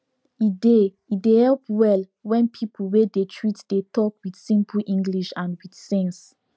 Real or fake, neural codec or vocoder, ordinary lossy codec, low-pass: real; none; none; none